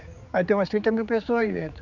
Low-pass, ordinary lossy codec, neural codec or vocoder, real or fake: 7.2 kHz; none; codec, 16 kHz, 4 kbps, X-Codec, HuBERT features, trained on general audio; fake